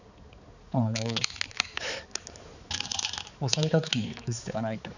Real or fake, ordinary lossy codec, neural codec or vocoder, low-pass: fake; none; codec, 16 kHz, 4 kbps, X-Codec, HuBERT features, trained on balanced general audio; 7.2 kHz